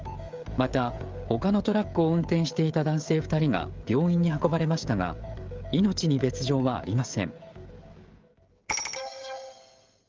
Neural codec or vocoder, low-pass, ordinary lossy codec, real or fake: codec, 16 kHz, 16 kbps, FreqCodec, smaller model; 7.2 kHz; Opus, 32 kbps; fake